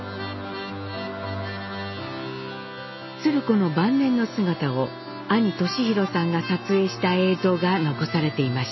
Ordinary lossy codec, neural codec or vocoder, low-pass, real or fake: MP3, 24 kbps; none; 7.2 kHz; real